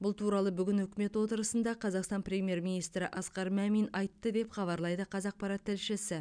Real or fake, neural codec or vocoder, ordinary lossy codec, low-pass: real; none; none; 9.9 kHz